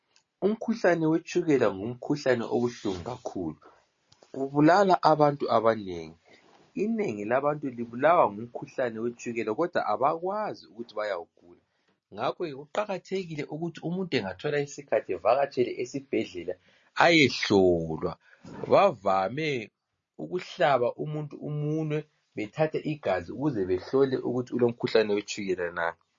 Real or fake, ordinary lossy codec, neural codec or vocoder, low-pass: real; MP3, 32 kbps; none; 7.2 kHz